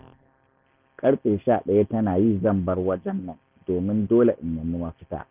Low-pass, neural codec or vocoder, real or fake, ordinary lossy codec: 3.6 kHz; none; real; Opus, 24 kbps